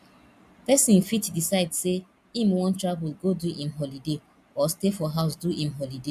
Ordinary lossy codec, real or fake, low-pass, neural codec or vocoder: AAC, 96 kbps; real; 14.4 kHz; none